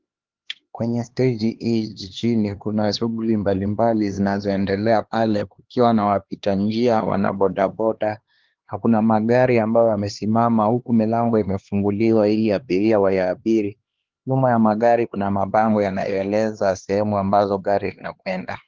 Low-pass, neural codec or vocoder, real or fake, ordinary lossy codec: 7.2 kHz; codec, 16 kHz, 2 kbps, X-Codec, HuBERT features, trained on LibriSpeech; fake; Opus, 16 kbps